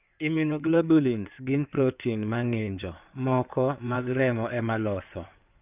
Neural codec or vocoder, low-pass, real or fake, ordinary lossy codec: codec, 16 kHz in and 24 kHz out, 2.2 kbps, FireRedTTS-2 codec; 3.6 kHz; fake; none